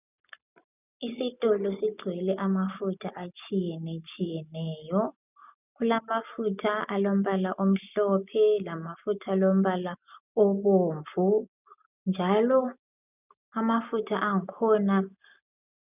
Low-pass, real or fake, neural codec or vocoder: 3.6 kHz; real; none